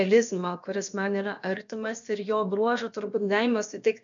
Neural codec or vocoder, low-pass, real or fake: codec, 16 kHz, about 1 kbps, DyCAST, with the encoder's durations; 7.2 kHz; fake